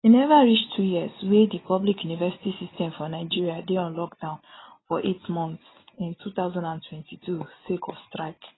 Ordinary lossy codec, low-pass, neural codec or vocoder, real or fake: AAC, 16 kbps; 7.2 kHz; none; real